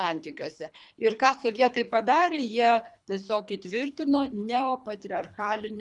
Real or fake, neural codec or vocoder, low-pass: fake; codec, 24 kHz, 3 kbps, HILCodec; 10.8 kHz